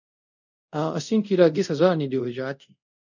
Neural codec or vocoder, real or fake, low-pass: codec, 24 kHz, 0.5 kbps, DualCodec; fake; 7.2 kHz